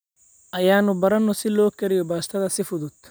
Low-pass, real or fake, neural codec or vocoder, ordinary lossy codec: none; real; none; none